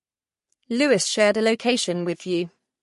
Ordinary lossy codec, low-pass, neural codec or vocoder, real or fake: MP3, 48 kbps; 14.4 kHz; codec, 44.1 kHz, 3.4 kbps, Pupu-Codec; fake